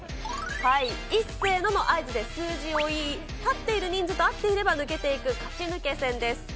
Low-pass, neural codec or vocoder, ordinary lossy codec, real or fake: none; none; none; real